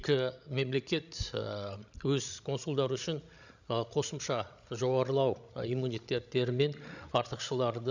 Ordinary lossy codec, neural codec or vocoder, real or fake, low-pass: none; codec, 16 kHz, 16 kbps, FreqCodec, larger model; fake; 7.2 kHz